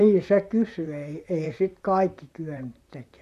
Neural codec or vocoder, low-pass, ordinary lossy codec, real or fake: vocoder, 44.1 kHz, 128 mel bands, Pupu-Vocoder; 14.4 kHz; none; fake